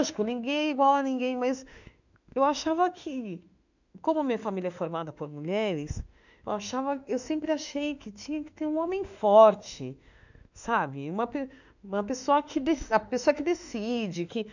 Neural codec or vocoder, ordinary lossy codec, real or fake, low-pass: autoencoder, 48 kHz, 32 numbers a frame, DAC-VAE, trained on Japanese speech; none; fake; 7.2 kHz